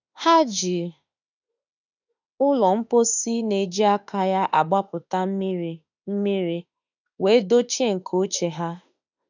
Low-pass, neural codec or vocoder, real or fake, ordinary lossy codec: 7.2 kHz; autoencoder, 48 kHz, 32 numbers a frame, DAC-VAE, trained on Japanese speech; fake; none